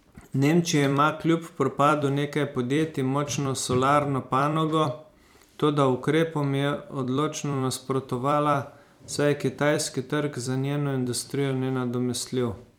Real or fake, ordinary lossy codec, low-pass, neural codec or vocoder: fake; none; 19.8 kHz; vocoder, 44.1 kHz, 128 mel bands every 256 samples, BigVGAN v2